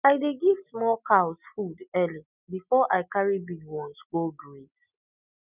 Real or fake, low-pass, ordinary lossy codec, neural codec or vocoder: real; 3.6 kHz; none; none